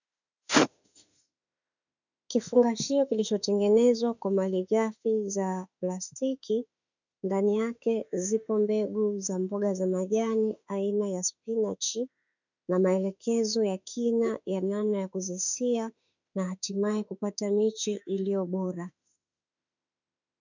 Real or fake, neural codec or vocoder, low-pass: fake; autoencoder, 48 kHz, 32 numbers a frame, DAC-VAE, trained on Japanese speech; 7.2 kHz